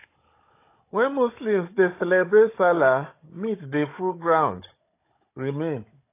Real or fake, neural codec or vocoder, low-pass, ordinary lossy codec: fake; codec, 16 kHz, 16 kbps, FunCodec, trained on Chinese and English, 50 frames a second; 3.6 kHz; AAC, 24 kbps